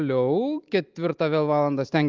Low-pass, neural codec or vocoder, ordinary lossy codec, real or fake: 7.2 kHz; none; Opus, 32 kbps; real